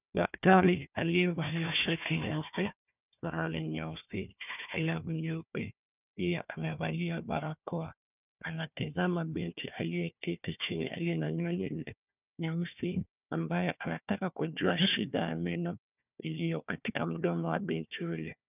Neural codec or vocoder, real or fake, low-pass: codec, 16 kHz, 1 kbps, FunCodec, trained on Chinese and English, 50 frames a second; fake; 3.6 kHz